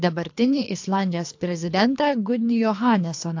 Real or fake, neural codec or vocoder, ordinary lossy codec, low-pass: fake; codec, 24 kHz, 3 kbps, HILCodec; AAC, 48 kbps; 7.2 kHz